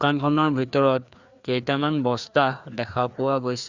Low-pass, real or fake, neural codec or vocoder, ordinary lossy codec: 7.2 kHz; fake; codec, 16 kHz, 2 kbps, FreqCodec, larger model; Opus, 64 kbps